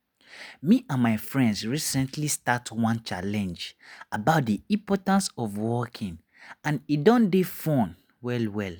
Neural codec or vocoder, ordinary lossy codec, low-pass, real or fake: none; none; none; real